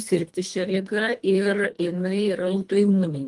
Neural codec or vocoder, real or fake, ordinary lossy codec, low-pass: codec, 24 kHz, 1.5 kbps, HILCodec; fake; Opus, 32 kbps; 10.8 kHz